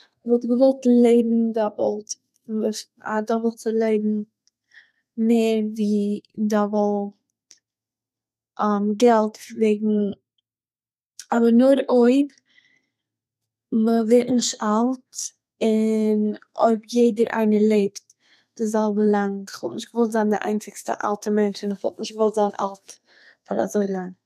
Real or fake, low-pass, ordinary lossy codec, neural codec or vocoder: fake; 14.4 kHz; none; codec, 32 kHz, 1.9 kbps, SNAC